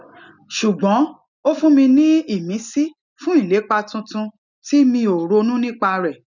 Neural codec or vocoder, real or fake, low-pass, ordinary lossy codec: none; real; 7.2 kHz; none